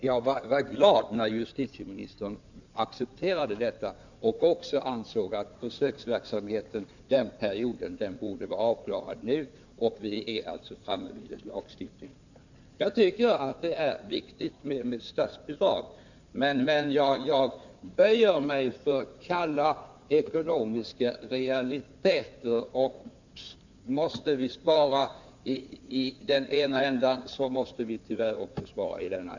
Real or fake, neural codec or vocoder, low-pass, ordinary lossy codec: fake; codec, 16 kHz in and 24 kHz out, 2.2 kbps, FireRedTTS-2 codec; 7.2 kHz; none